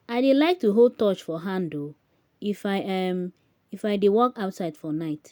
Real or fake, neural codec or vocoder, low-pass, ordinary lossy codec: real; none; none; none